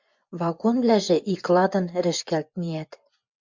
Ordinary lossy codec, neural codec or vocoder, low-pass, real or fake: MP3, 64 kbps; vocoder, 24 kHz, 100 mel bands, Vocos; 7.2 kHz; fake